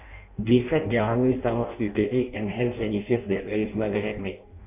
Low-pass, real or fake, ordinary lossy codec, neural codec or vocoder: 3.6 kHz; fake; none; codec, 16 kHz in and 24 kHz out, 0.6 kbps, FireRedTTS-2 codec